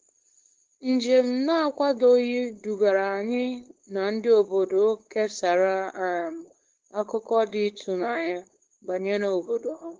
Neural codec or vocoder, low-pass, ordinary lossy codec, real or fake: codec, 16 kHz, 4.8 kbps, FACodec; 7.2 kHz; Opus, 16 kbps; fake